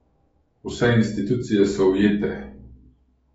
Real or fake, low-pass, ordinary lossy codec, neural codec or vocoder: fake; 19.8 kHz; AAC, 24 kbps; autoencoder, 48 kHz, 128 numbers a frame, DAC-VAE, trained on Japanese speech